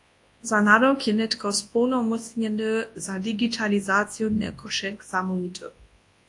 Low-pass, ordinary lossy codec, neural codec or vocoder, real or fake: 10.8 kHz; AAC, 48 kbps; codec, 24 kHz, 0.9 kbps, WavTokenizer, large speech release; fake